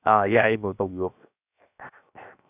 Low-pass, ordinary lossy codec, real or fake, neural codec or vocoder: 3.6 kHz; none; fake; codec, 16 kHz, 0.7 kbps, FocalCodec